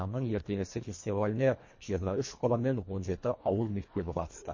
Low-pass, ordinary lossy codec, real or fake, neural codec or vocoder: 7.2 kHz; MP3, 32 kbps; fake; codec, 24 kHz, 1.5 kbps, HILCodec